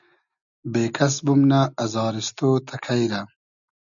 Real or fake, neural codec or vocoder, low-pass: real; none; 7.2 kHz